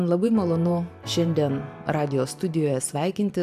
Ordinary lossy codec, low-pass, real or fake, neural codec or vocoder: AAC, 96 kbps; 14.4 kHz; fake; vocoder, 44.1 kHz, 128 mel bands every 512 samples, BigVGAN v2